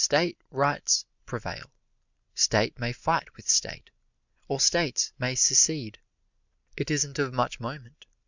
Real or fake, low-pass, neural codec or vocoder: real; 7.2 kHz; none